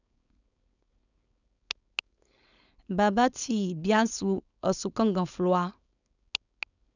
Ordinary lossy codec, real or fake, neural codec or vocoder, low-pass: none; fake; codec, 16 kHz, 4.8 kbps, FACodec; 7.2 kHz